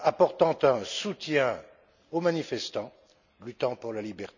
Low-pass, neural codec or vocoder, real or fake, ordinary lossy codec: 7.2 kHz; none; real; none